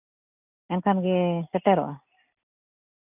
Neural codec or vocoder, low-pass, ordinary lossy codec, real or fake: none; 3.6 kHz; none; real